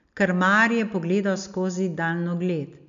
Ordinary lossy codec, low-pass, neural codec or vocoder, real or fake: MP3, 64 kbps; 7.2 kHz; none; real